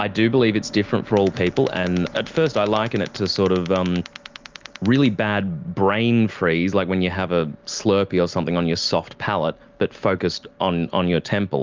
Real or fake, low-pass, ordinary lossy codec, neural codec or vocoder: real; 7.2 kHz; Opus, 24 kbps; none